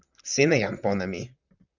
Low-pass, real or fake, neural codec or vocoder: 7.2 kHz; fake; vocoder, 44.1 kHz, 128 mel bands, Pupu-Vocoder